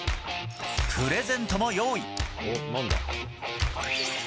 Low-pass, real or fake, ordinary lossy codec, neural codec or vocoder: none; real; none; none